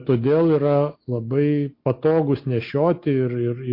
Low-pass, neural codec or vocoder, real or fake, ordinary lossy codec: 5.4 kHz; none; real; MP3, 32 kbps